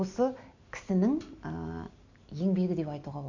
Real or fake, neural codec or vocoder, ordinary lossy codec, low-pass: real; none; none; 7.2 kHz